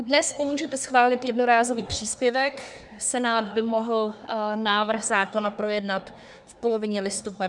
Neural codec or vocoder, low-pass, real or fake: codec, 24 kHz, 1 kbps, SNAC; 10.8 kHz; fake